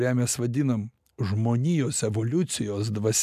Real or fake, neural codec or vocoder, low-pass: real; none; 14.4 kHz